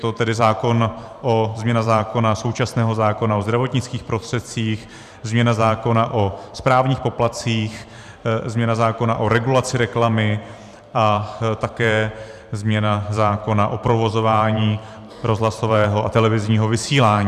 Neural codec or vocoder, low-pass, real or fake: vocoder, 44.1 kHz, 128 mel bands every 512 samples, BigVGAN v2; 14.4 kHz; fake